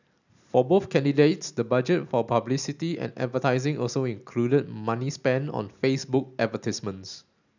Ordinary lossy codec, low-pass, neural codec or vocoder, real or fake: none; 7.2 kHz; none; real